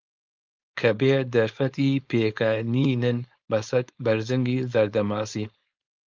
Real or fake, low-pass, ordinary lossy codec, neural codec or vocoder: fake; 7.2 kHz; Opus, 24 kbps; codec, 16 kHz, 4.8 kbps, FACodec